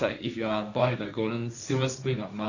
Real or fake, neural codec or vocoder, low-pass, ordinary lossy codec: fake; codec, 16 kHz, 1.1 kbps, Voila-Tokenizer; 7.2 kHz; none